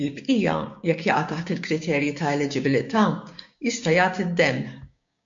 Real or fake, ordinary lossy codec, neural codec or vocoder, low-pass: fake; MP3, 48 kbps; codec, 16 kHz, 6 kbps, DAC; 7.2 kHz